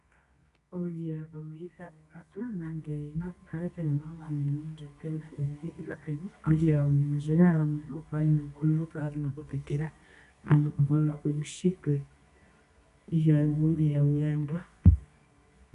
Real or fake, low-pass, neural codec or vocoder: fake; 10.8 kHz; codec, 24 kHz, 0.9 kbps, WavTokenizer, medium music audio release